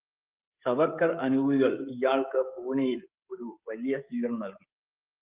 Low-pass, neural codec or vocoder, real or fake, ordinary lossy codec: 3.6 kHz; codec, 16 kHz, 16 kbps, FreqCodec, smaller model; fake; Opus, 32 kbps